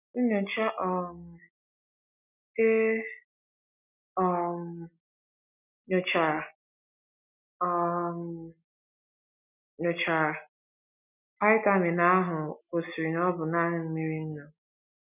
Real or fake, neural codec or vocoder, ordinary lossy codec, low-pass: real; none; none; 3.6 kHz